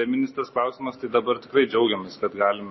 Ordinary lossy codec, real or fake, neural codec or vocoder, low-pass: MP3, 24 kbps; real; none; 7.2 kHz